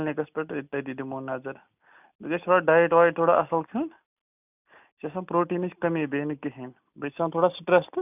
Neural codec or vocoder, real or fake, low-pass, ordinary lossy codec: none; real; 3.6 kHz; none